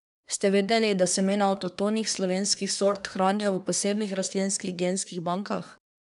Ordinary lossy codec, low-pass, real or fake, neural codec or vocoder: none; 10.8 kHz; fake; codec, 24 kHz, 1 kbps, SNAC